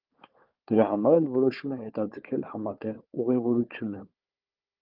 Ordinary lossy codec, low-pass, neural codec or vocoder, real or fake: Opus, 24 kbps; 5.4 kHz; codec, 16 kHz, 4 kbps, FunCodec, trained on Chinese and English, 50 frames a second; fake